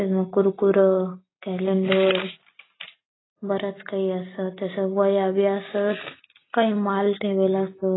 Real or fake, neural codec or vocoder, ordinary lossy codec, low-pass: real; none; AAC, 16 kbps; 7.2 kHz